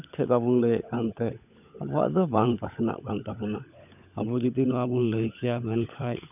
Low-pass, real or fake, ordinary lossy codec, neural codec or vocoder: 3.6 kHz; fake; none; codec, 16 kHz, 16 kbps, FunCodec, trained on LibriTTS, 50 frames a second